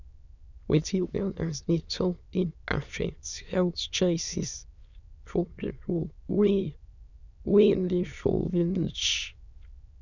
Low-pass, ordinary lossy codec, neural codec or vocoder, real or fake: 7.2 kHz; none; autoencoder, 22.05 kHz, a latent of 192 numbers a frame, VITS, trained on many speakers; fake